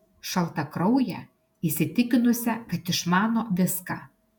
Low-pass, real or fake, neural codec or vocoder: 19.8 kHz; fake; vocoder, 48 kHz, 128 mel bands, Vocos